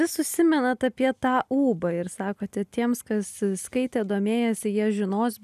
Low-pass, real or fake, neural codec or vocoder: 14.4 kHz; real; none